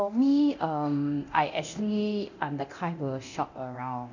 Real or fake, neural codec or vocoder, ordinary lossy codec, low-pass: fake; codec, 24 kHz, 0.9 kbps, DualCodec; none; 7.2 kHz